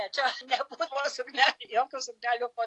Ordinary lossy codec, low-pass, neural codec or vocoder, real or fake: AAC, 48 kbps; 10.8 kHz; none; real